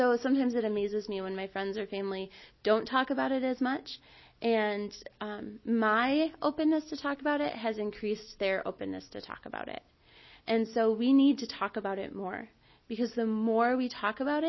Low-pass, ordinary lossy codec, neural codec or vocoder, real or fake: 7.2 kHz; MP3, 24 kbps; none; real